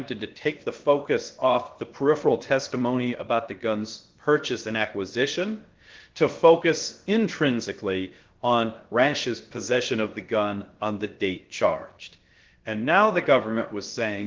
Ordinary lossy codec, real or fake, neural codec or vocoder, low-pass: Opus, 16 kbps; fake; codec, 16 kHz, about 1 kbps, DyCAST, with the encoder's durations; 7.2 kHz